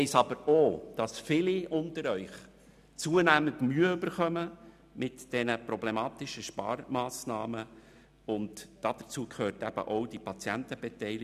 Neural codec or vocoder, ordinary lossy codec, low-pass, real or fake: none; none; 14.4 kHz; real